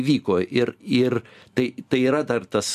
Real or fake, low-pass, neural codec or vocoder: real; 14.4 kHz; none